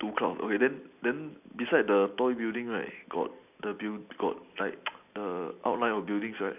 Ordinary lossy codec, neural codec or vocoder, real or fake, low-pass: none; none; real; 3.6 kHz